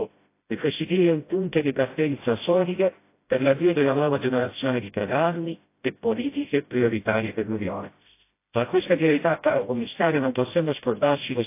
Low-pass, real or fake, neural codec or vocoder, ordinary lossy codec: 3.6 kHz; fake; codec, 16 kHz, 0.5 kbps, FreqCodec, smaller model; AAC, 24 kbps